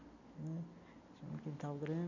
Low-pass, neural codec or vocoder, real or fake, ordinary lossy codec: 7.2 kHz; none; real; none